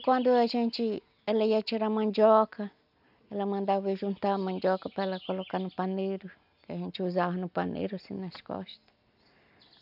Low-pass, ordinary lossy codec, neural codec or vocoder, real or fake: 5.4 kHz; none; none; real